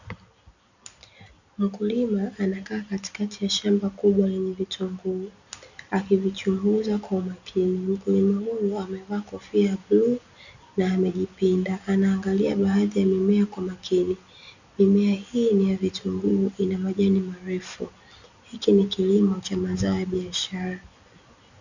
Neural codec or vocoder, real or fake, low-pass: none; real; 7.2 kHz